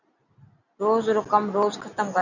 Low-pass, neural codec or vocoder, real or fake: 7.2 kHz; none; real